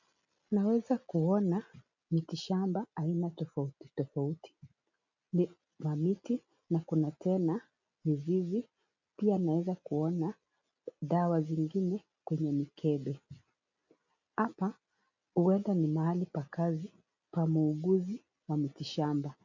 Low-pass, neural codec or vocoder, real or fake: 7.2 kHz; none; real